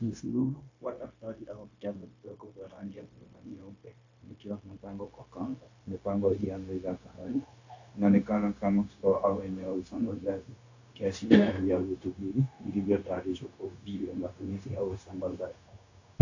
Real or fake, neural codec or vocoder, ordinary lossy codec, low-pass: fake; codec, 16 kHz, 0.9 kbps, LongCat-Audio-Codec; AAC, 48 kbps; 7.2 kHz